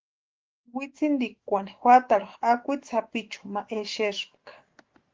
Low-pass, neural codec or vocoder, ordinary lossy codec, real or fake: 7.2 kHz; autoencoder, 48 kHz, 128 numbers a frame, DAC-VAE, trained on Japanese speech; Opus, 16 kbps; fake